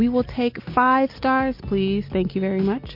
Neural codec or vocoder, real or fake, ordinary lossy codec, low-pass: none; real; MP3, 32 kbps; 5.4 kHz